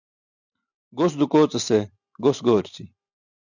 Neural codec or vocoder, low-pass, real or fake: none; 7.2 kHz; real